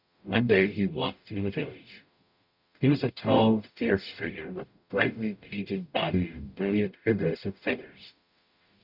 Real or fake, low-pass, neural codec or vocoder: fake; 5.4 kHz; codec, 44.1 kHz, 0.9 kbps, DAC